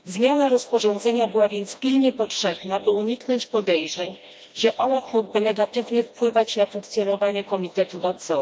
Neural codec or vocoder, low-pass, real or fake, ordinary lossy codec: codec, 16 kHz, 1 kbps, FreqCodec, smaller model; none; fake; none